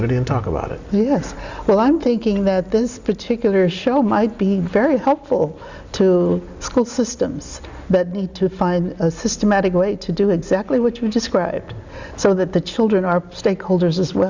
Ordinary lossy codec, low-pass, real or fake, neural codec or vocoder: Opus, 64 kbps; 7.2 kHz; real; none